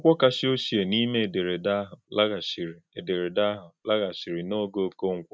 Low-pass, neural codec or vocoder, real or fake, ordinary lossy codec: none; none; real; none